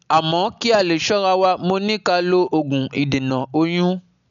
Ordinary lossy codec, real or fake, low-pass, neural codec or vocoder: none; real; 7.2 kHz; none